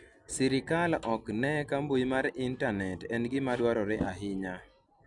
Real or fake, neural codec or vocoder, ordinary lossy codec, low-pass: real; none; none; 10.8 kHz